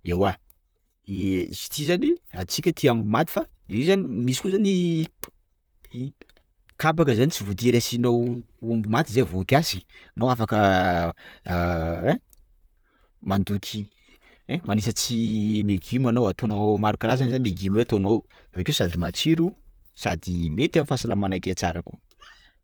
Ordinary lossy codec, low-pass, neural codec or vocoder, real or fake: none; none; vocoder, 44.1 kHz, 128 mel bands, Pupu-Vocoder; fake